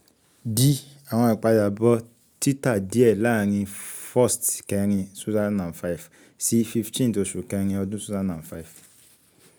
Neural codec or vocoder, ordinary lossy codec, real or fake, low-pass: none; none; real; none